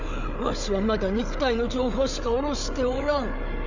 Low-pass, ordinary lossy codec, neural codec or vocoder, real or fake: 7.2 kHz; none; codec, 16 kHz, 8 kbps, FreqCodec, larger model; fake